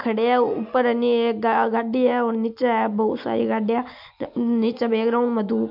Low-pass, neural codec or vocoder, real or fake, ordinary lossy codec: 5.4 kHz; none; real; MP3, 48 kbps